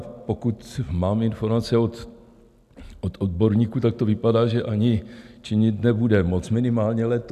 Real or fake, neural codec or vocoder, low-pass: real; none; 14.4 kHz